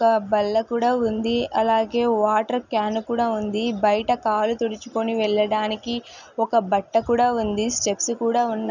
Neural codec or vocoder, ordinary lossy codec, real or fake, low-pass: none; none; real; 7.2 kHz